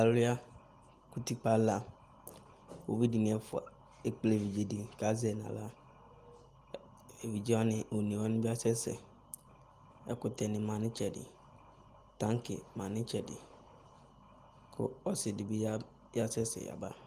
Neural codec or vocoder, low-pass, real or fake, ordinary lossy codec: none; 14.4 kHz; real; Opus, 24 kbps